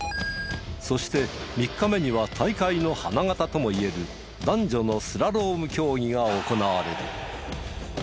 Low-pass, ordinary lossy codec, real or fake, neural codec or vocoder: none; none; real; none